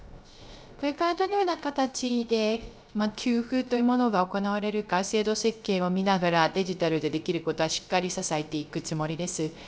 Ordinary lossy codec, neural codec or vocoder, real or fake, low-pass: none; codec, 16 kHz, 0.3 kbps, FocalCodec; fake; none